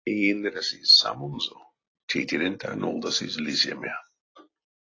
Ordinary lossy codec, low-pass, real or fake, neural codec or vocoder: AAC, 32 kbps; 7.2 kHz; real; none